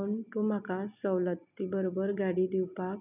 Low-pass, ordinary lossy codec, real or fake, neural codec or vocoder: 3.6 kHz; none; real; none